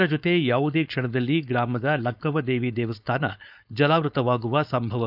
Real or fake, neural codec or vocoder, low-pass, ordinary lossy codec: fake; codec, 16 kHz, 4.8 kbps, FACodec; 5.4 kHz; none